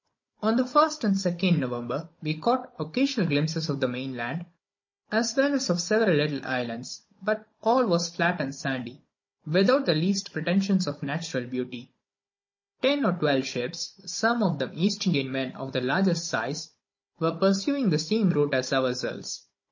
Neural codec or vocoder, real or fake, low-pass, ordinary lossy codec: codec, 16 kHz, 16 kbps, FunCodec, trained on Chinese and English, 50 frames a second; fake; 7.2 kHz; MP3, 32 kbps